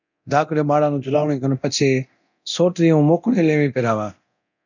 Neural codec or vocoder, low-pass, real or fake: codec, 24 kHz, 0.9 kbps, DualCodec; 7.2 kHz; fake